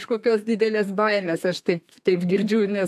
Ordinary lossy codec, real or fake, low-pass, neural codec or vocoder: AAC, 96 kbps; fake; 14.4 kHz; codec, 32 kHz, 1.9 kbps, SNAC